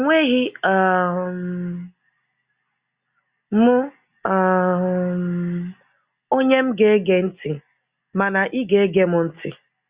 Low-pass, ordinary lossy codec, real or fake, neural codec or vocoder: 3.6 kHz; Opus, 64 kbps; real; none